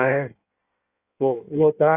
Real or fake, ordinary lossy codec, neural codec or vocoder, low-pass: fake; none; codec, 16 kHz in and 24 kHz out, 1.1 kbps, FireRedTTS-2 codec; 3.6 kHz